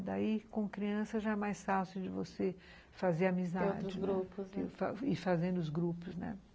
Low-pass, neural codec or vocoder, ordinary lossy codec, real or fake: none; none; none; real